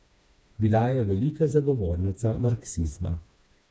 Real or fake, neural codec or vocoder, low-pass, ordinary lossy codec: fake; codec, 16 kHz, 2 kbps, FreqCodec, smaller model; none; none